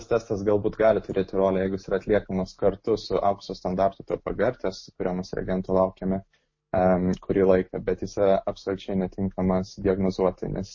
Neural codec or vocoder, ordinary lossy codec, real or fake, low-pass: none; MP3, 32 kbps; real; 7.2 kHz